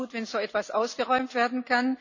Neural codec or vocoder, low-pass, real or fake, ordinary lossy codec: none; 7.2 kHz; real; none